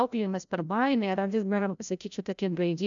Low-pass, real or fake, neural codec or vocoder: 7.2 kHz; fake; codec, 16 kHz, 0.5 kbps, FreqCodec, larger model